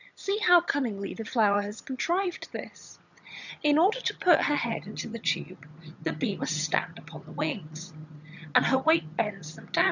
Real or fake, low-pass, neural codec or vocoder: fake; 7.2 kHz; vocoder, 22.05 kHz, 80 mel bands, HiFi-GAN